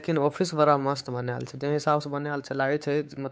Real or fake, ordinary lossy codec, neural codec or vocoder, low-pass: fake; none; codec, 16 kHz, 4 kbps, X-Codec, WavLM features, trained on Multilingual LibriSpeech; none